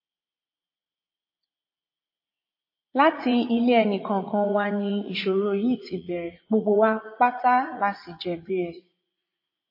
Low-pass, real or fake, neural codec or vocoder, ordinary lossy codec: 5.4 kHz; fake; vocoder, 44.1 kHz, 80 mel bands, Vocos; MP3, 24 kbps